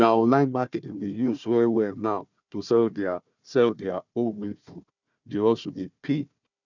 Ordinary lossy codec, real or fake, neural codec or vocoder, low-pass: none; fake; codec, 16 kHz, 1 kbps, FunCodec, trained on Chinese and English, 50 frames a second; 7.2 kHz